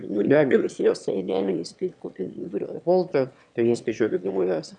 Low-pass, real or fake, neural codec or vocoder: 9.9 kHz; fake; autoencoder, 22.05 kHz, a latent of 192 numbers a frame, VITS, trained on one speaker